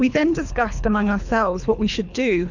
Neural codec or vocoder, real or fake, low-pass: codec, 24 kHz, 3 kbps, HILCodec; fake; 7.2 kHz